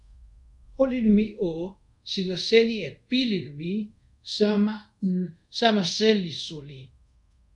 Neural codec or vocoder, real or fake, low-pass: codec, 24 kHz, 0.5 kbps, DualCodec; fake; 10.8 kHz